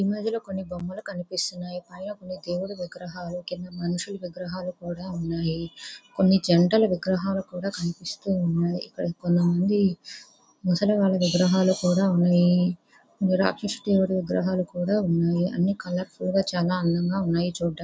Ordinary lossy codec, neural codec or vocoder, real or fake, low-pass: none; none; real; none